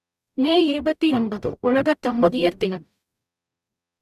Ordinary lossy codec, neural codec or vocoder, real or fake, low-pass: none; codec, 44.1 kHz, 0.9 kbps, DAC; fake; 14.4 kHz